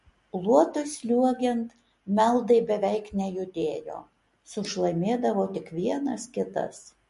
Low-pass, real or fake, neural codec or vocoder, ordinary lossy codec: 14.4 kHz; real; none; MP3, 48 kbps